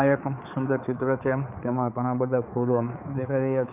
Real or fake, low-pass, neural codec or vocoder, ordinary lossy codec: fake; 3.6 kHz; codec, 16 kHz, 4 kbps, X-Codec, HuBERT features, trained on balanced general audio; none